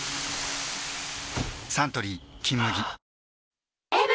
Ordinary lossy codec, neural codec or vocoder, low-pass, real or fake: none; none; none; real